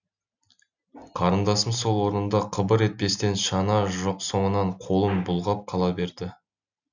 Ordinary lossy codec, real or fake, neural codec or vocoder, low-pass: none; real; none; 7.2 kHz